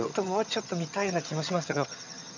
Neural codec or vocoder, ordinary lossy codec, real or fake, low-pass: vocoder, 22.05 kHz, 80 mel bands, HiFi-GAN; none; fake; 7.2 kHz